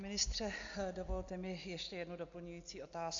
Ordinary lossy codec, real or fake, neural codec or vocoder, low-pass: AAC, 64 kbps; real; none; 7.2 kHz